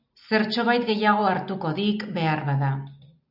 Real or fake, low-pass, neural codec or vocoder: real; 5.4 kHz; none